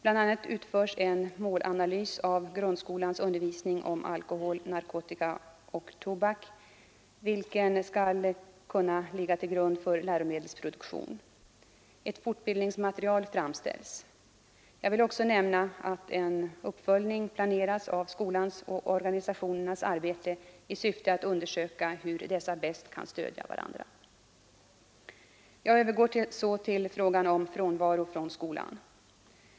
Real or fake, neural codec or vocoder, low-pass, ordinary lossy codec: real; none; none; none